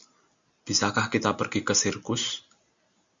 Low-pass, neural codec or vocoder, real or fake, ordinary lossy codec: 7.2 kHz; none; real; Opus, 64 kbps